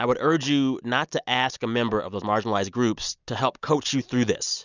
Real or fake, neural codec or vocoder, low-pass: real; none; 7.2 kHz